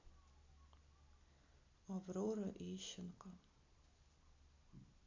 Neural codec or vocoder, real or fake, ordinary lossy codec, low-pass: none; real; MP3, 64 kbps; 7.2 kHz